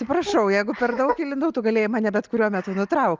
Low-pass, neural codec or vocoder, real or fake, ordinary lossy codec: 7.2 kHz; none; real; Opus, 24 kbps